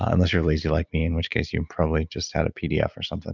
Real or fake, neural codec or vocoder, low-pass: real; none; 7.2 kHz